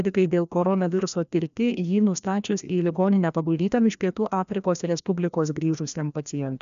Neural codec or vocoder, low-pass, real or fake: codec, 16 kHz, 1 kbps, FreqCodec, larger model; 7.2 kHz; fake